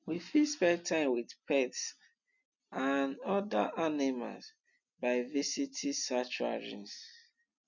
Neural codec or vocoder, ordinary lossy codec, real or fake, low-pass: none; none; real; 7.2 kHz